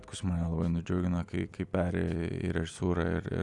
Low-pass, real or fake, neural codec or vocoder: 10.8 kHz; real; none